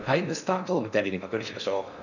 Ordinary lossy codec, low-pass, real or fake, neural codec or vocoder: none; 7.2 kHz; fake; codec, 16 kHz in and 24 kHz out, 0.6 kbps, FocalCodec, streaming, 4096 codes